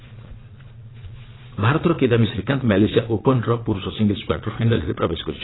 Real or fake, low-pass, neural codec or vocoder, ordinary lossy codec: fake; 7.2 kHz; vocoder, 22.05 kHz, 80 mel bands, Vocos; AAC, 16 kbps